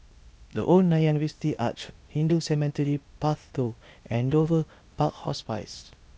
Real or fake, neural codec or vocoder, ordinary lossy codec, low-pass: fake; codec, 16 kHz, 0.8 kbps, ZipCodec; none; none